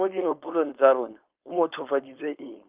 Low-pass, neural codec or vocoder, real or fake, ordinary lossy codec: 3.6 kHz; codec, 16 kHz, 4.8 kbps, FACodec; fake; Opus, 32 kbps